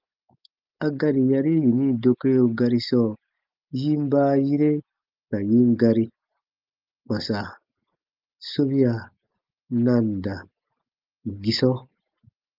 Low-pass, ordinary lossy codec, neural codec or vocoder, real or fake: 5.4 kHz; Opus, 32 kbps; none; real